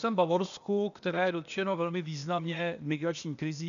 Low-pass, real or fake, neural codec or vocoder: 7.2 kHz; fake; codec, 16 kHz, 0.8 kbps, ZipCodec